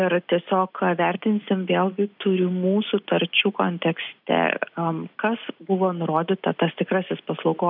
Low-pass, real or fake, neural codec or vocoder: 5.4 kHz; real; none